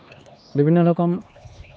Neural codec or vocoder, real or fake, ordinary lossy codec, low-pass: codec, 16 kHz, 2 kbps, X-Codec, HuBERT features, trained on LibriSpeech; fake; none; none